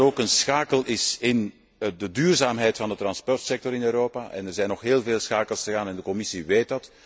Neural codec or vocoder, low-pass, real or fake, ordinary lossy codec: none; none; real; none